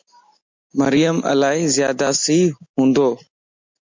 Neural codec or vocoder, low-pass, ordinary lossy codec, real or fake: none; 7.2 kHz; MP3, 64 kbps; real